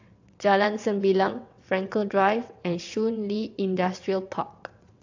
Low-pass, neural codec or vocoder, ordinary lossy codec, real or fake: 7.2 kHz; vocoder, 22.05 kHz, 80 mel bands, WaveNeXt; AAC, 48 kbps; fake